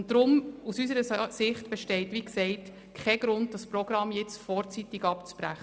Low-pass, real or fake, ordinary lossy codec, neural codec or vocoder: none; real; none; none